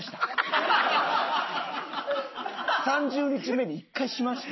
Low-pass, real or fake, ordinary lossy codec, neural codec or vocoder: 7.2 kHz; real; MP3, 24 kbps; none